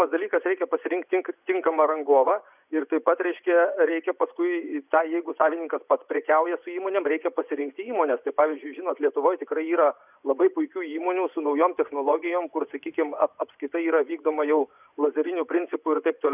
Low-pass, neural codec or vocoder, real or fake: 3.6 kHz; vocoder, 24 kHz, 100 mel bands, Vocos; fake